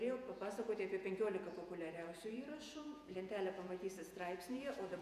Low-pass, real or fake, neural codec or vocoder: 14.4 kHz; fake; vocoder, 48 kHz, 128 mel bands, Vocos